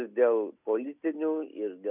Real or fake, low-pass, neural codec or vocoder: real; 3.6 kHz; none